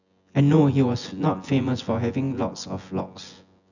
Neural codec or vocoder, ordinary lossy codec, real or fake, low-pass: vocoder, 24 kHz, 100 mel bands, Vocos; none; fake; 7.2 kHz